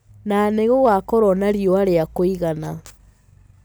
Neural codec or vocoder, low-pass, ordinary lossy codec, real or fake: none; none; none; real